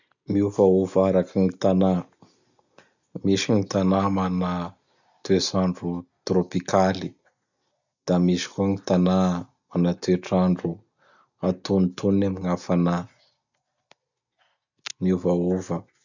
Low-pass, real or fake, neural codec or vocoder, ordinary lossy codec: 7.2 kHz; real; none; none